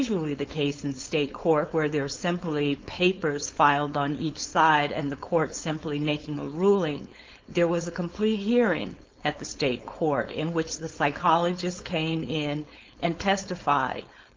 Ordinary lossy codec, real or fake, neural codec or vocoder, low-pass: Opus, 32 kbps; fake; codec, 16 kHz, 4.8 kbps, FACodec; 7.2 kHz